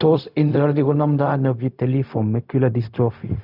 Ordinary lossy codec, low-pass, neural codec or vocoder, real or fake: none; 5.4 kHz; codec, 16 kHz, 0.4 kbps, LongCat-Audio-Codec; fake